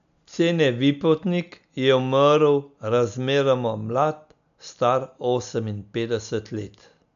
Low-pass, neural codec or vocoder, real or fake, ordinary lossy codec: 7.2 kHz; none; real; AAC, 96 kbps